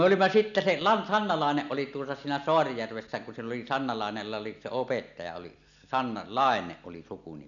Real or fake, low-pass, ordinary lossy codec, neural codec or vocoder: real; 7.2 kHz; none; none